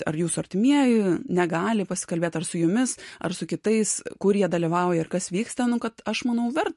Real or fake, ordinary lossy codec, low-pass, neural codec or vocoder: real; MP3, 48 kbps; 14.4 kHz; none